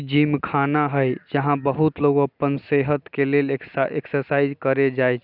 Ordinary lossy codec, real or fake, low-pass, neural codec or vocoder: none; real; 5.4 kHz; none